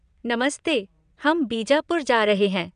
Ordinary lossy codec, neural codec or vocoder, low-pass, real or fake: none; vocoder, 22.05 kHz, 80 mel bands, WaveNeXt; 9.9 kHz; fake